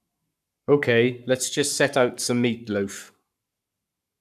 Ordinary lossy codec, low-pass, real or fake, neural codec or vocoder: none; 14.4 kHz; fake; codec, 44.1 kHz, 7.8 kbps, Pupu-Codec